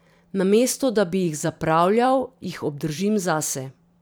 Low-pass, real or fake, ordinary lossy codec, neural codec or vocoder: none; fake; none; vocoder, 44.1 kHz, 128 mel bands every 512 samples, BigVGAN v2